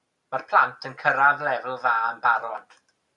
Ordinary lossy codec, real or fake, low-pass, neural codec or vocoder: Opus, 64 kbps; real; 10.8 kHz; none